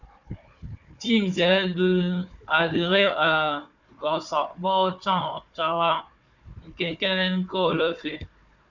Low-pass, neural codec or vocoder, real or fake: 7.2 kHz; codec, 16 kHz, 4 kbps, FunCodec, trained on Chinese and English, 50 frames a second; fake